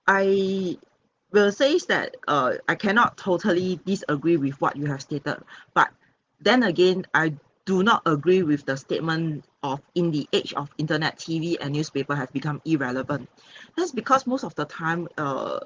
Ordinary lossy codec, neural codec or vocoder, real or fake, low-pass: Opus, 16 kbps; none; real; 7.2 kHz